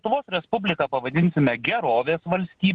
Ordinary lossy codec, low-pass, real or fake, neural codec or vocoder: Opus, 16 kbps; 10.8 kHz; real; none